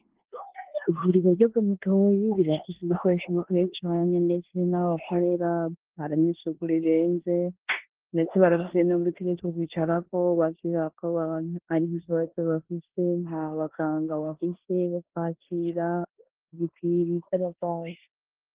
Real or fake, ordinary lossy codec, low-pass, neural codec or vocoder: fake; Opus, 16 kbps; 3.6 kHz; codec, 16 kHz in and 24 kHz out, 0.9 kbps, LongCat-Audio-Codec, four codebook decoder